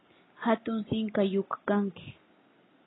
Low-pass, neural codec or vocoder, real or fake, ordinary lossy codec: 7.2 kHz; none; real; AAC, 16 kbps